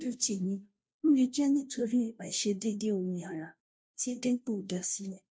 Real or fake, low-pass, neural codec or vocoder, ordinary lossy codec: fake; none; codec, 16 kHz, 0.5 kbps, FunCodec, trained on Chinese and English, 25 frames a second; none